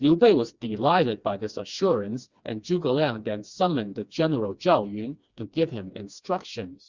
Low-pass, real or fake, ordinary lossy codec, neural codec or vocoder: 7.2 kHz; fake; Opus, 64 kbps; codec, 16 kHz, 2 kbps, FreqCodec, smaller model